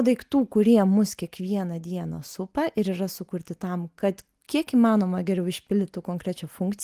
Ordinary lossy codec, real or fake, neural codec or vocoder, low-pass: Opus, 24 kbps; real; none; 14.4 kHz